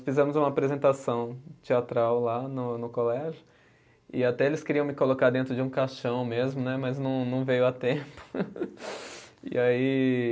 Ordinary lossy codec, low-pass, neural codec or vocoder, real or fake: none; none; none; real